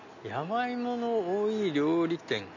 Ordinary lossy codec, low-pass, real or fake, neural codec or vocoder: none; 7.2 kHz; real; none